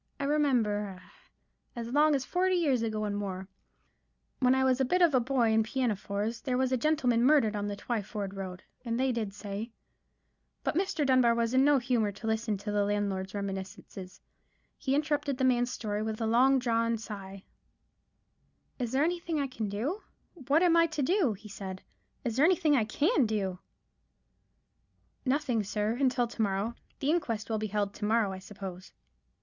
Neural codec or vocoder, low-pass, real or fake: none; 7.2 kHz; real